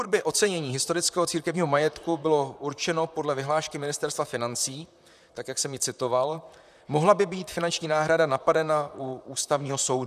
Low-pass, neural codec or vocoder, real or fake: 14.4 kHz; vocoder, 44.1 kHz, 128 mel bands, Pupu-Vocoder; fake